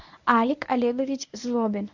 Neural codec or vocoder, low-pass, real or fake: codec, 24 kHz, 0.9 kbps, WavTokenizer, medium speech release version 1; 7.2 kHz; fake